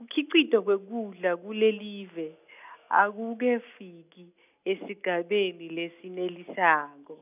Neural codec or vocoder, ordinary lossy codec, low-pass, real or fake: none; none; 3.6 kHz; real